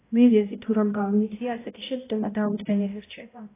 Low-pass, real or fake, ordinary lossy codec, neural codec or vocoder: 3.6 kHz; fake; AAC, 16 kbps; codec, 16 kHz, 0.5 kbps, X-Codec, HuBERT features, trained on balanced general audio